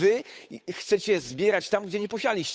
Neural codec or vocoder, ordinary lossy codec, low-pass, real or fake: codec, 16 kHz, 8 kbps, FunCodec, trained on Chinese and English, 25 frames a second; none; none; fake